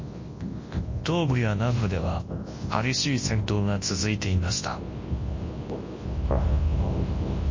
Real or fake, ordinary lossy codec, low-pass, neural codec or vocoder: fake; MP3, 32 kbps; 7.2 kHz; codec, 24 kHz, 0.9 kbps, WavTokenizer, large speech release